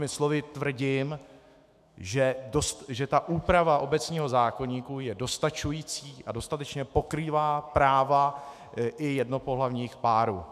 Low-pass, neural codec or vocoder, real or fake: 14.4 kHz; autoencoder, 48 kHz, 128 numbers a frame, DAC-VAE, trained on Japanese speech; fake